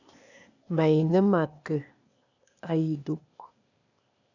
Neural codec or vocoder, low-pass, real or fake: codec, 16 kHz, 0.8 kbps, ZipCodec; 7.2 kHz; fake